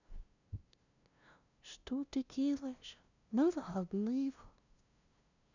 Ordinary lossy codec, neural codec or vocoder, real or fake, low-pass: none; codec, 16 kHz, 0.5 kbps, FunCodec, trained on LibriTTS, 25 frames a second; fake; 7.2 kHz